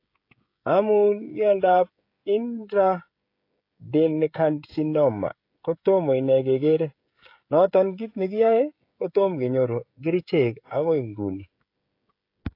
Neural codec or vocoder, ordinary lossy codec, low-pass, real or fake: codec, 16 kHz, 16 kbps, FreqCodec, smaller model; AAC, 32 kbps; 5.4 kHz; fake